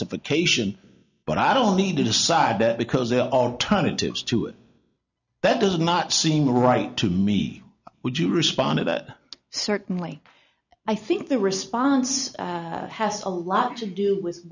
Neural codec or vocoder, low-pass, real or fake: none; 7.2 kHz; real